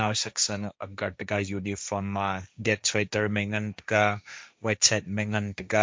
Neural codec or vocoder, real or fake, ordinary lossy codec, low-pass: codec, 16 kHz, 1.1 kbps, Voila-Tokenizer; fake; none; none